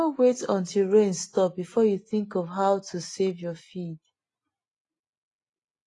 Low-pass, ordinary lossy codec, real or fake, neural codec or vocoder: 10.8 kHz; AAC, 32 kbps; real; none